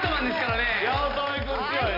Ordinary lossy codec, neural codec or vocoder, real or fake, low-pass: none; none; real; 5.4 kHz